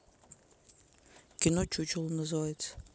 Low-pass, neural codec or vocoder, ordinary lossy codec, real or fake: none; none; none; real